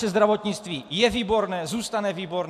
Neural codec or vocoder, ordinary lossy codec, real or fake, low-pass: none; AAC, 96 kbps; real; 14.4 kHz